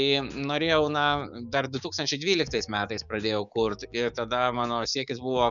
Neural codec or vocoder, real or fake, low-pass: codec, 16 kHz, 6 kbps, DAC; fake; 7.2 kHz